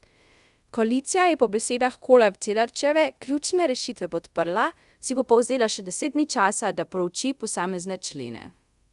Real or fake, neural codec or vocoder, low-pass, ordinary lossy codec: fake; codec, 24 kHz, 0.5 kbps, DualCodec; 10.8 kHz; Opus, 64 kbps